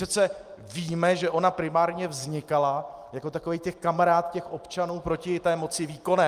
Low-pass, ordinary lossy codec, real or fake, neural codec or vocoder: 14.4 kHz; Opus, 32 kbps; real; none